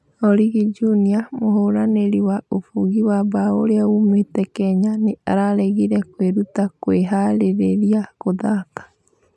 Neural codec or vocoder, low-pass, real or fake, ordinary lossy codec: none; none; real; none